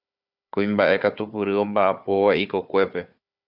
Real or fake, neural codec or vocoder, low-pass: fake; codec, 16 kHz, 4 kbps, FunCodec, trained on Chinese and English, 50 frames a second; 5.4 kHz